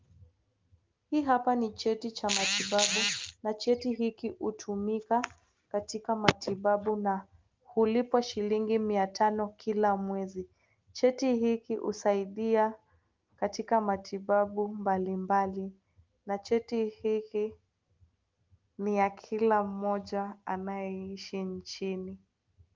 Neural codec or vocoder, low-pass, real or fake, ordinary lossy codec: none; 7.2 kHz; real; Opus, 32 kbps